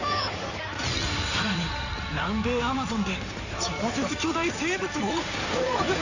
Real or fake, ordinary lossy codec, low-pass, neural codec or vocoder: fake; none; 7.2 kHz; codec, 16 kHz in and 24 kHz out, 2.2 kbps, FireRedTTS-2 codec